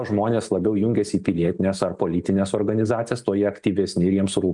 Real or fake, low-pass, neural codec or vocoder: real; 10.8 kHz; none